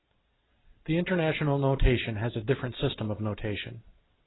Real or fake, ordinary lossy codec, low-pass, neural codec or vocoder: real; AAC, 16 kbps; 7.2 kHz; none